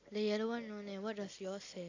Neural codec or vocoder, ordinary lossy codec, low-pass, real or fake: none; AAC, 48 kbps; 7.2 kHz; real